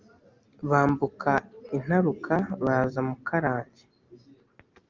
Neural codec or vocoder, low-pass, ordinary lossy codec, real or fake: none; 7.2 kHz; Opus, 32 kbps; real